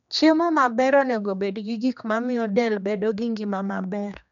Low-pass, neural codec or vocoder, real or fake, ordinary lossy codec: 7.2 kHz; codec, 16 kHz, 2 kbps, X-Codec, HuBERT features, trained on general audio; fake; none